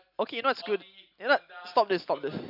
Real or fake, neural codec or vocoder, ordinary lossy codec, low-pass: real; none; none; 5.4 kHz